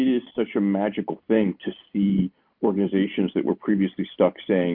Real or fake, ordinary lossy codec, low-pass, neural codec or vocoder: real; Opus, 64 kbps; 5.4 kHz; none